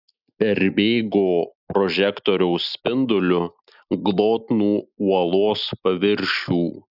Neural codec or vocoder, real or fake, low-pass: none; real; 5.4 kHz